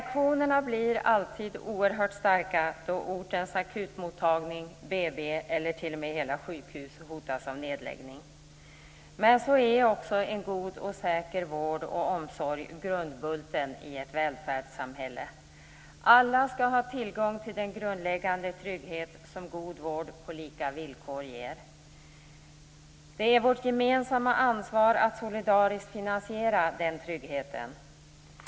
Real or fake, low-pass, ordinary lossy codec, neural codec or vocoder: real; none; none; none